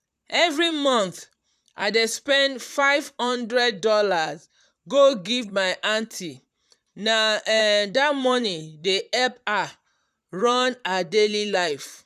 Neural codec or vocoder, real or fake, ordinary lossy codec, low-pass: vocoder, 44.1 kHz, 128 mel bands, Pupu-Vocoder; fake; none; 14.4 kHz